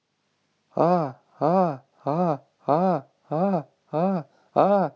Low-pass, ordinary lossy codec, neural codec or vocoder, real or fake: none; none; none; real